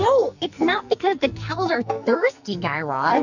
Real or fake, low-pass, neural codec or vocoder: fake; 7.2 kHz; codec, 44.1 kHz, 2.6 kbps, SNAC